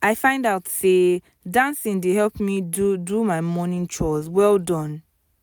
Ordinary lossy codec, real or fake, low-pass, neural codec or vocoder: none; real; none; none